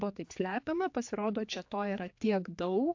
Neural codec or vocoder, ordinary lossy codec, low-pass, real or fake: codec, 16 kHz, 4 kbps, X-Codec, HuBERT features, trained on general audio; AAC, 48 kbps; 7.2 kHz; fake